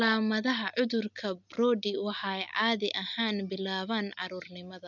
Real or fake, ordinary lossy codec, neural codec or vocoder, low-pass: real; none; none; 7.2 kHz